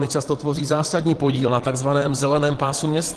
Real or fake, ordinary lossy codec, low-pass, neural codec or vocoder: fake; Opus, 16 kbps; 9.9 kHz; vocoder, 22.05 kHz, 80 mel bands, Vocos